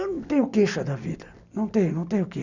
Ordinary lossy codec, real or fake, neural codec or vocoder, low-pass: none; real; none; 7.2 kHz